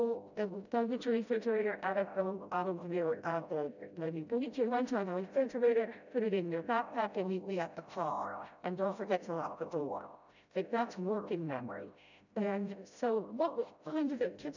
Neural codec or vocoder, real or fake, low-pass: codec, 16 kHz, 0.5 kbps, FreqCodec, smaller model; fake; 7.2 kHz